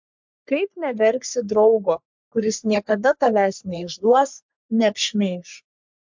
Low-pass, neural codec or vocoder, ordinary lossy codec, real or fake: 7.2 kHz; codec, 44.1 kHz, 3.4 kbps, Pupu-Codec; MP3, 64 kbps; fake